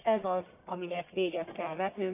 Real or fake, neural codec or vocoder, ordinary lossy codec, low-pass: fake; codec, 44.1 kHz, 1.7 kbps, Pupu-Codec; none; 3.6 kHz